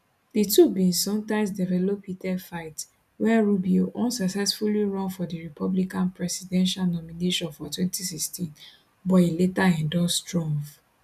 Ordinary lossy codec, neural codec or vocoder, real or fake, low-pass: none; none; real; 14.4 kHz